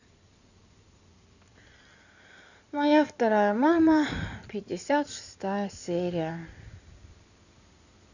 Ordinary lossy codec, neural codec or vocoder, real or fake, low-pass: none; codec, 16 kHz in and 24 kHz out, 2.2 kbps, FireRedTTS-2 codec; fake; 7.2 kHz